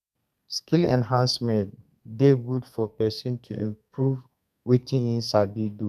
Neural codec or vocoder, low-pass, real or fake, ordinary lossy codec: codec, 32 kHz, 1.9 kbps, SNAC; 14.4 kHz; fake; none